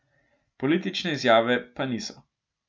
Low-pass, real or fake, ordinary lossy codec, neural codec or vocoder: none; real; none; none